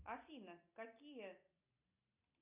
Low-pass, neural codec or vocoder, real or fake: 3.6 kHz; none; real